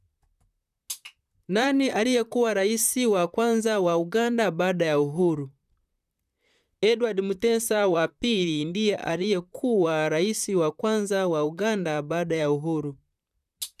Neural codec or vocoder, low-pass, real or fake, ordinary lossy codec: vocoder, 44.1 kHz, 128 mel bands, Pupu-Vocoder; 14.4 kHz; fake; none